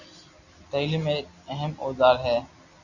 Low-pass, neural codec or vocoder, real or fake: 7.2 kHz; none; real